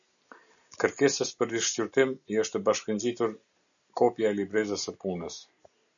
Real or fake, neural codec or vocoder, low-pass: real; none; 7.2 kHz